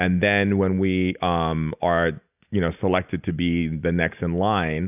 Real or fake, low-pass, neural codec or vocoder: real; 3.6 kHz; none